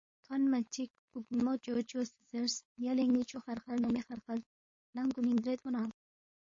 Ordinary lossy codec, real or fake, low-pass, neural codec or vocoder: MP3, 32 kbps; fake; 7.2 kHz; codec, 16 kHz, 4.8 kbps, FACodec